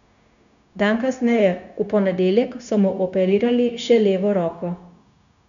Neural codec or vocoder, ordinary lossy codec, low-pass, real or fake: codec, 16 kHz, 0.9 kbps, LongCat-Audio-Codec; none; 7.2 kHz; fake